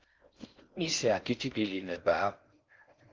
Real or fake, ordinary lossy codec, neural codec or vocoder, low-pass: fake; Opus, 32 kbps; codec, 16 kHz in and 24 kHz out, 0.6 kbps, FocalCodec, streaming, 4096 codes; 7.2 kHz